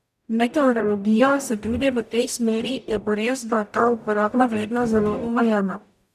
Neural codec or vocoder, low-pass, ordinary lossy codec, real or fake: codec, 44.1 kHz, 0.9 kbps, DAC; 14.4 kHz; none; fake